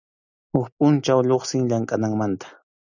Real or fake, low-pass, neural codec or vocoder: real; 7.2 kHz; none